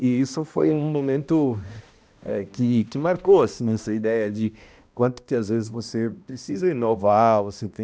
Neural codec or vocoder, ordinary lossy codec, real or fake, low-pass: codec, 16 kHz, 1 kbps, X-Codec, HuBERT features, trained on balanced general audio; none; fake; none